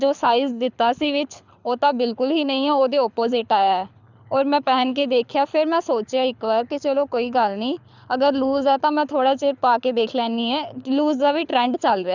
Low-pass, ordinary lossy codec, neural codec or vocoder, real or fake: 7.2 kHz; none; codec, 24 kHz, 6 kbps, HILCodec; fake